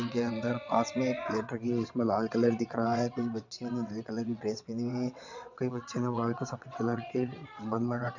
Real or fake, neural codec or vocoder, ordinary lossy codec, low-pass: fake; vocoder, 22.05 kHz, 80 mel bands, WaveNeXt; none; 7.2 kHz